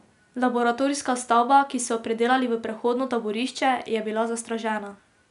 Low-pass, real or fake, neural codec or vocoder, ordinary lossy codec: 10.8 kHz; real; none; none